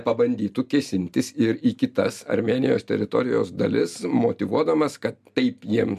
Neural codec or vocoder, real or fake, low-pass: none; real; 14.4 kHz